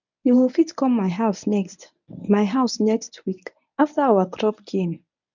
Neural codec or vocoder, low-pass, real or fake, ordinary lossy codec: codec, 24 kHz, 0.9 kbps, WavTokenizer, medium speech release version 1; 7.2 kHz; fake; none